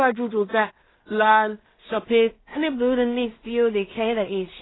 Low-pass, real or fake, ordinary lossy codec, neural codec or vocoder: 7.2 kHz; fake; AAC, 16 kbps; codec, 16 kHz in and 24 kHz out, 0.4 kbps, LongCat-Audio-Codec, two codebook decoder